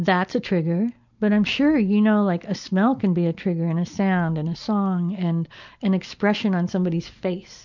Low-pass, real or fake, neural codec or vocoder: 7.2 kHz; real; none